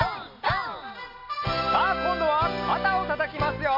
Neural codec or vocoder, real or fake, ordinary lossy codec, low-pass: none; real; MP3, 32 kbps; 5.4 kHz